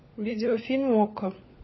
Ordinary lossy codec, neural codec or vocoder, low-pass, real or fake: MP3, 24 kbps; codec, 16 kHz, 16 kbps, FunCodec, trained on LibriTTS, 50 frames a second; 7.2 kHz; fake